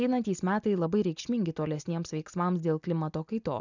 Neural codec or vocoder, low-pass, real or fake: none; 7.2 kHz; real